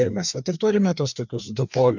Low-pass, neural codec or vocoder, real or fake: 7.2 kHz; codec, 16 kHz, 2 kbps, FreqCodec, larger model; fake